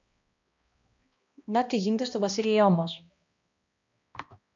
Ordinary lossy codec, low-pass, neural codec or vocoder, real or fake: MP3, 48 kbps; 7.2 kHz; codec, 16 kHz, 1 kbps, X-Codec, HuBERT features, trained on balanced general audio; fake